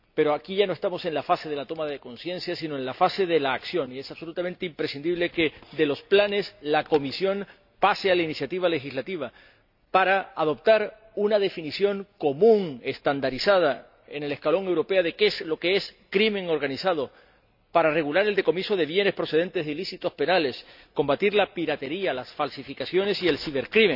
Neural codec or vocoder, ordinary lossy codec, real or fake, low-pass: none; none; real; 5.4 kHz